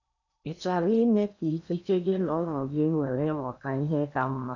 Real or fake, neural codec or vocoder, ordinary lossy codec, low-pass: fake; codec, 16 kHz in and 24 kHz out, 0.8 kbps, FocalCodec, streaming, 65536 codes; none; 7.2 kHz